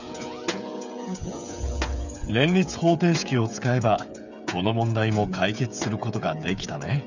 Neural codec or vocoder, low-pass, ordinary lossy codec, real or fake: codec, 16 kHz, 8 kbps, FreqCodec, smaller model; 7.2 kHz; none; fake